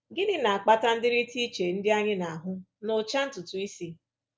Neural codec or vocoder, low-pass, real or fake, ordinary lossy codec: none; none; real; none